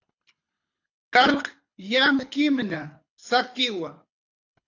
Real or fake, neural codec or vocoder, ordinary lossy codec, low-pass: fake; codec, 24 kHz, 6 kbps, HILCodec; AAC, 48 kbps; 7.2 kHz